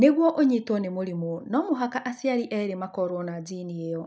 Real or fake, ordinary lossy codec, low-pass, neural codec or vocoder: real; none; none; none